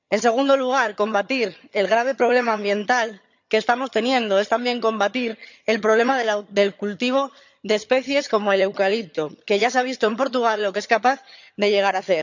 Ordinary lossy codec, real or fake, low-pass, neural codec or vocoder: none; fake; 7.2 kHz; vocoder, 22.05 kHz, 80 mel bands, HiFi-GAN